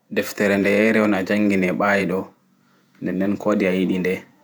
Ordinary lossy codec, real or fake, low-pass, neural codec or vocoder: none; fake; none; vocoder, 48 kHz, 128 mel bands, Vocos